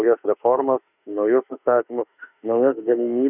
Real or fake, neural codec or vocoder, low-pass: fake; autoencoder, 48 kHz, 32 numbers a frame, DAC-VAE, trained on Japanese speech; 3.6 kHz